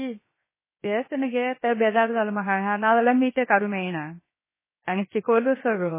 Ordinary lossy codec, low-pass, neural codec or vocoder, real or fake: MP3, 16 kbps; 3.6 kHz; codec, 16 kHz, 0.7 kbps, FocalCodec; fake